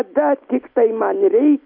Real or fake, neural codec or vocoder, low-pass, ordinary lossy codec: real; none; 5.4 kHz; MP3, 32 kbps